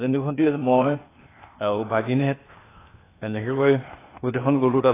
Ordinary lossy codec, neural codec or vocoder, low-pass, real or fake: AAC, 16 kbps; codec, 16 kHz, 0.8 kbps, ZipCodec; 3.6 kHz; fake